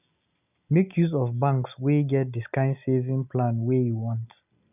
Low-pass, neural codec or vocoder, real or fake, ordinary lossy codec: 3.6 kHz; none; real; none